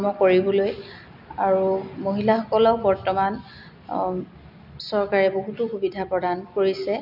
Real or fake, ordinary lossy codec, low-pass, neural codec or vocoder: real; none; 5.4 kHz; none